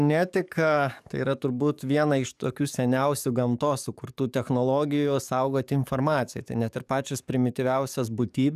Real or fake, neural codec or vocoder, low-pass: fake; codec, 44.1 kHz, 7.8 kbps, DAC; 14.4 kHz